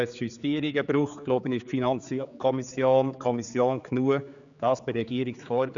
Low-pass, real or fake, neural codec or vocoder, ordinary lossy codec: 7.2 kHz; fake; codec, 16 kHz, 4 kbps, X-Codec, HuBERT features, trained on general audio; none